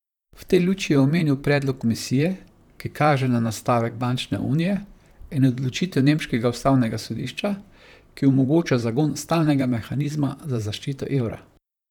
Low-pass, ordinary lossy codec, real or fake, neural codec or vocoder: 19.8 kHz; none; fake; vocoder, 44.1 kHz, 128 mel bands, Pupu-Vocoder